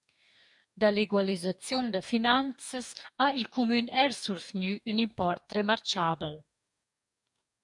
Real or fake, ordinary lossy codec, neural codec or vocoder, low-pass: fake; MP3, 96 kbps; codec, 44.1 kHz, 2.6 kbps, DAC; 10.8 kHz